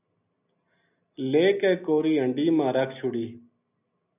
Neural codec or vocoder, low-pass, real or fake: none; 3.6 kHz; real